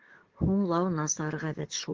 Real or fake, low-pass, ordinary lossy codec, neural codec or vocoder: fake; 7.2 kHz; Opus, 16 kbps; codec, 44.1 kHz, 7.8 kbps, DAC